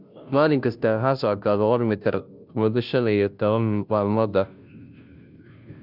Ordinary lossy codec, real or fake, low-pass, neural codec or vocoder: none; fake; 5.4 kHz; codec, 16 kHz, 0.5 kbps, FunCodec, trained on Chinese and English, 25 frames a second